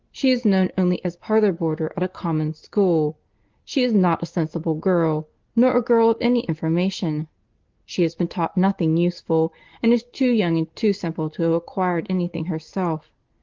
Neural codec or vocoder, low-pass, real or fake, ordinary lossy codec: none; 7.2 kHz; real; Opus, 16 kbps